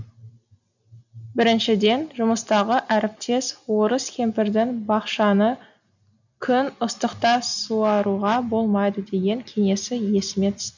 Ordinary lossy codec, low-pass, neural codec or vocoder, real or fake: none; 7.2 kHz; none; real